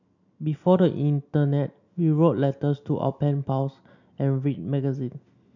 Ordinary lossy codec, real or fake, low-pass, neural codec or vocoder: none; real; 7.2 kHz; none